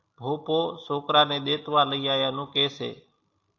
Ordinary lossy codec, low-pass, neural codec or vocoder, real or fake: AAC, 48 kbps; 7.2 kHz; none; real